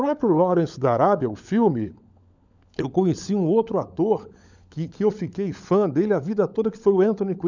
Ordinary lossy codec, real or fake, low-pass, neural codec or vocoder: none; fake; 7.2 kHz; codec, 16 kHz, 16 kbps, FunCodec, trained on LibriTTS, 50 frames a second